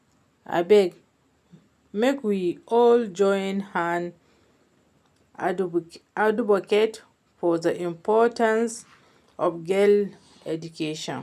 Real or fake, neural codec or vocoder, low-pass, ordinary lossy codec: real; none; 14.4 kHz; none